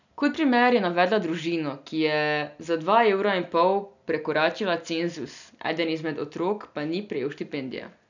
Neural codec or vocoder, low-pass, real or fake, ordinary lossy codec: none; 7.2 kHz; real; none